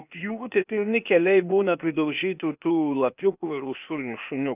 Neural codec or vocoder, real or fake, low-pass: codec, 16 kHz, 0.8 kbps, ZipCodec; fake; 3.6 kHz